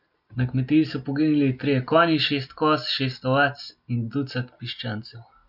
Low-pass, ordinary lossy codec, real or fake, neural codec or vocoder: 5.4 kHz; none; real; none